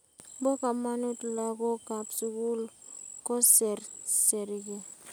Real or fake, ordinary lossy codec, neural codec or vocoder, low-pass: real; none; none; none